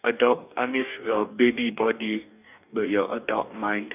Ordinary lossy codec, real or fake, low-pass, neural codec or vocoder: none; fake; 3.6 kHz; codec, 44.1 kHz, 2.6 kbps, DAC